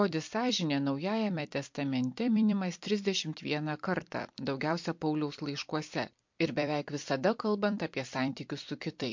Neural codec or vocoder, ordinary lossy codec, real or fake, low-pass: vocoder, 44.1 kHz, 128 mel bands every 256 samples, BigVGAN v2; MP3, 48 kbps; fake; 7.2 kHz